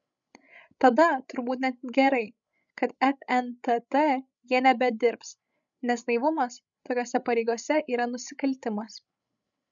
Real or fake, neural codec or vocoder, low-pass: fake; codec, 16 kHz, 16 kbps, FreqCodec, larger model; 7.2 kHz